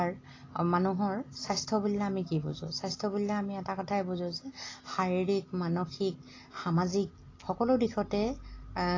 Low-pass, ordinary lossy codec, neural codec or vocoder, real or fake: 7.2 kHz; AAC, 32 kbps; none; real